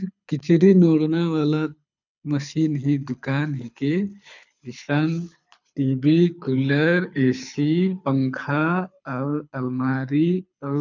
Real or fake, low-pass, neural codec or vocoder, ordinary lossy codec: fake; 7.2 kHz; codec, 24 kHz, 6 kbps, HILCodec; none